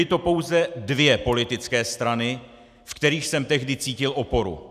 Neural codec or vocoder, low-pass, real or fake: none; 14.4 kHz; real